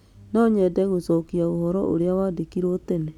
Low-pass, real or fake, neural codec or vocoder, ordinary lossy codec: 19.8 kHz; real; none; none